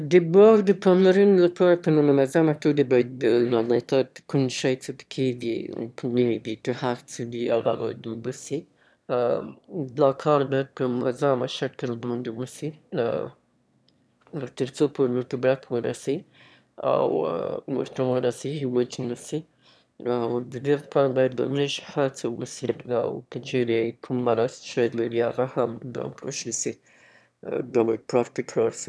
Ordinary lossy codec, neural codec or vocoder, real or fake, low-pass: none; autoencoder, 22.05 kHz, a latent of 192 numbers a frame, VITS, trained on one speaker; fake; none